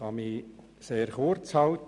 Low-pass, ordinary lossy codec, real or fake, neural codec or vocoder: 10.8 kHz; none; real; none